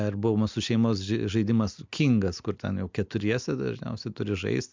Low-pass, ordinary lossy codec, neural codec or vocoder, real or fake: 7.2 kHz; MP3, 64 kbps; none; real